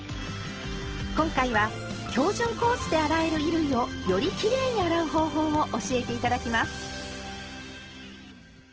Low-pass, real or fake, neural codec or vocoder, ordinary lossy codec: 7.2 kHz; real; none; Opus, 16 kbps